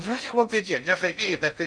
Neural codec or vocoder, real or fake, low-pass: codec, 16 kHz in and 24 kHz out, 0.6 kbps, FocalCodec, streaming, 4096 codes; fake; 9.9 kHz